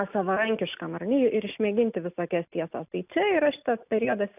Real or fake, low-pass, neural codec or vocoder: real; 3.6 kHz; none